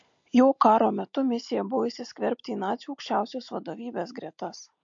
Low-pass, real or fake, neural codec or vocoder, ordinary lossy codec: 7.2 kHz; real; none; MP3, 48 kbps